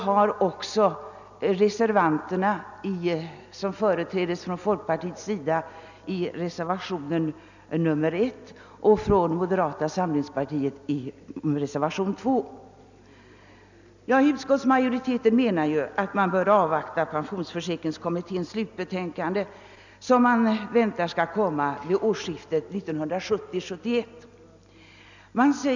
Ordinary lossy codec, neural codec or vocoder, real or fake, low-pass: none; none; real; 7.2 kHz